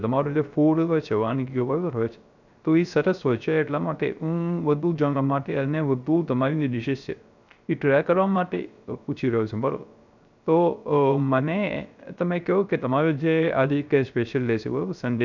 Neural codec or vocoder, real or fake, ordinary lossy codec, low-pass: codec, 16 kHz, 0.3 kbps, FocalCodec; fake; none; 7.2 kHz